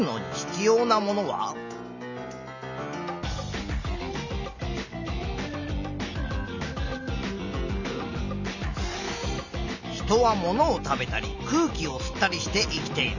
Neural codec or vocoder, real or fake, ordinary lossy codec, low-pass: none; real; none; 7.2 kHz